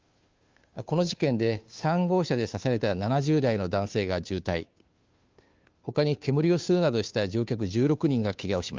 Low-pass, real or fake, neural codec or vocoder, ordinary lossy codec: 7.2 kHz; fake; codec, 16 kHz, 2 kbps, FunCodec, trained on Chinese and English, 25 frames a second; Opus, 32 kbps